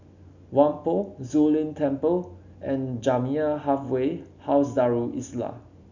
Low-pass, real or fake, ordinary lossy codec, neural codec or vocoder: 7.2 kHz; real; none; none